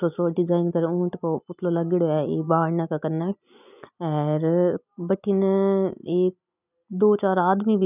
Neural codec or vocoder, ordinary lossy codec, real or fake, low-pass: none; none; real; 3.6 kHz